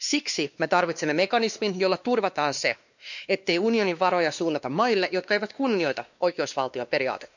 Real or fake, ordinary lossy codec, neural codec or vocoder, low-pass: fake; none; codec, 16 kHz, 2 kbps, X-Codec, WavLM features, trained on Multilingual LibriSpeech; 7.2 kHz